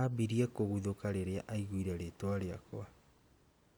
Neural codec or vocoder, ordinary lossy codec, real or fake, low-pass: none; none; real; none